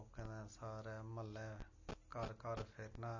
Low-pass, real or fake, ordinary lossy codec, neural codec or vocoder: 7.2 kHz; real; MP3, 32 kbps; none